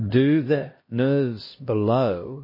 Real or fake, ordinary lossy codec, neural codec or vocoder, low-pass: fake; MP3, 24 kbps; codec, 16 kHz, 1 kbps, X-Codec, HuBERT features, trained on LibriSpeech; 5.4 kHz